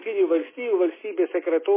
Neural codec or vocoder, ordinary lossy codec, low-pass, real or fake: none; MP3, 16 kbps; 3.6 kHz; real